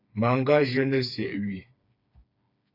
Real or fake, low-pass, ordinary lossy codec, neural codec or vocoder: fake; 5.4 kHz; AAC, 32 kbps; codec, 16 kHz, 4 kbps, FreqCodec, smaller model